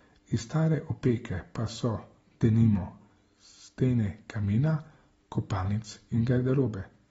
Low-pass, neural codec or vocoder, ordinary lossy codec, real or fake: 19.8 kHz; none; AAC, 24 kbps; real